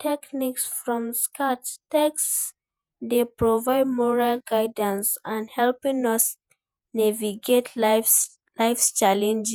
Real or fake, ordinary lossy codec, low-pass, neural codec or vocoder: fake; none; none; vocoder, 48 kHz, 128 mel bands, Vocos